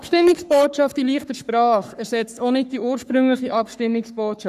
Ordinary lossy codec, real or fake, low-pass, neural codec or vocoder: none; fake; 14.4 kHz; codec, 44.1 kHz, 3.4 kbps, Pupu-Codec